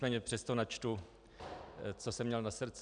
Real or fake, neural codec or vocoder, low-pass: real; none; 9.9 kHz